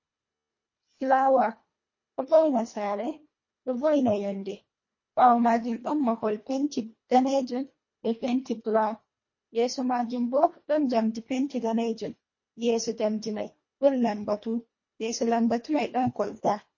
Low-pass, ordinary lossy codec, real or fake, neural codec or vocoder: 7.2 kHz; MP3, 32 kbps; fake; codec, 24 kHz, 1.5 kbps, HILCodec